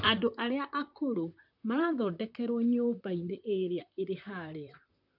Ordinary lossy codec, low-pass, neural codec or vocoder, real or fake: none; 5.4 kHz; vocoder, 44.1 kHz, 128 mel bands, Pupu-Vocoder; fake